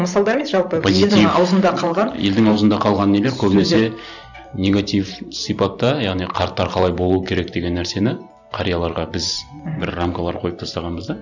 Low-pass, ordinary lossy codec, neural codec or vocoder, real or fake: 7.2 kHz; none; vocoder, 44.1 kHz, 128 mel bands every 512 samples, BigVGAN v2; fake